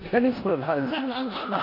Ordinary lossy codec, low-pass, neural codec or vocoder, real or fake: MP3, 32 kbps; 5.4 kHz; codec, 16 kHz in and 24 kHz out, 0.9 kbps, LongCat-Audio-Codec, four codebook decoder; fake